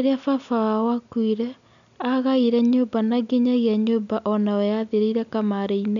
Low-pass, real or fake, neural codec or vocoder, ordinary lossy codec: 7.2 kHz; real; none; none